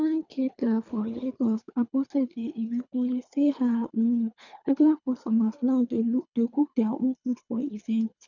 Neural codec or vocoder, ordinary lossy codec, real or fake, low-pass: codec, 24 kHz, 3 kbps, HILCodec; none; fake; 7.2 kHz